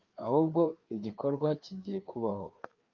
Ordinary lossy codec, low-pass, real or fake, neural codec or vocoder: Opus, 24 kbps; 7.2 kHz; fake; codec, 16 kHz, 2 kbps, FreqCodec, larger model